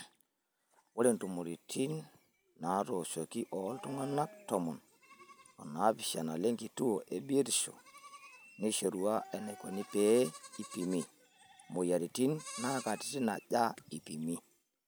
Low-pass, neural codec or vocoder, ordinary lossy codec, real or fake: none; none; none; real